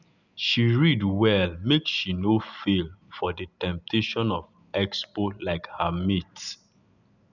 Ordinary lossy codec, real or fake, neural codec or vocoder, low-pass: none; real; none; 7.2 kHz